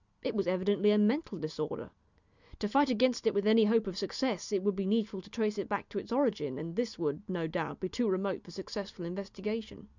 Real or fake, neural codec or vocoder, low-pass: real; none; 7.2 kHz